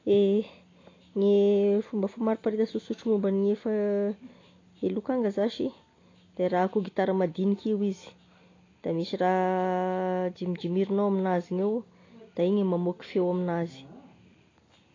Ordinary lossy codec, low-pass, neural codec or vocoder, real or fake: AAC, 32 kbps; 7.2 kHz; none; real